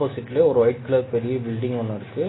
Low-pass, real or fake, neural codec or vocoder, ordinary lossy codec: 7.2 kHz; real; none; AAC, 16 kbps